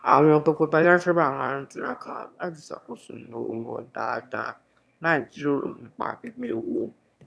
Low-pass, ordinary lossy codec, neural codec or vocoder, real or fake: none; none; autoencoder, 22.05 kHz, a latent of 192 numbers a frame, VITS, trained on one speaker; fake